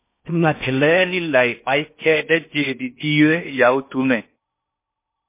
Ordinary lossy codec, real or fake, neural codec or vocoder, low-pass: MP3, 24 kbps; fake; codec, 16 kHz in and 24 kHz out, 0.6 kbps, FocalCodec, streaming, 4096 codes; 3.6 kHz